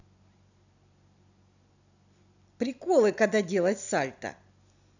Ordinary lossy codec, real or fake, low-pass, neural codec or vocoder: none; real; 7.2 kHz; none